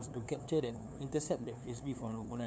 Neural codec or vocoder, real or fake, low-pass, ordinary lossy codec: codec, 16 kHz, 2 kbps, FunCodec, trained on LibriTTS, 25 frames a second; fake; none; none